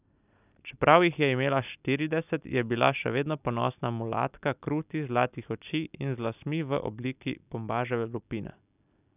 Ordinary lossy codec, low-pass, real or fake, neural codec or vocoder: none; 3.6 kHz; real; none